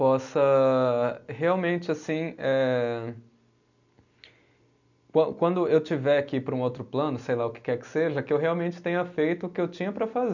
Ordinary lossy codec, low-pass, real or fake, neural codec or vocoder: none; 7.2 kHz; real; none